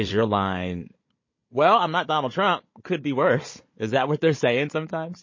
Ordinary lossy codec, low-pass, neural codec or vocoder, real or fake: MP3, 32 kbps; 7.2 kHz; codec, 16 kHz, 16 kbps, FunCodec, trained on Chinese and English, 50 frames a second; fake